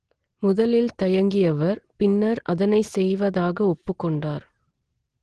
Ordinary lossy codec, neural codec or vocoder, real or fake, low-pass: Opus, 16 kbps; none; real; 9.9 kHz